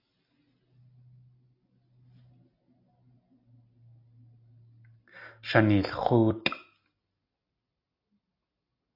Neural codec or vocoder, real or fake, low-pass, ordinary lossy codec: none; real; 5.4 kHz; AAC, 32 kbps